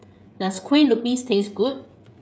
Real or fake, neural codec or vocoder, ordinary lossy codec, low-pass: fake; codec, 16 kHz, 16 kbps, FreqCodec, smaller model; none; none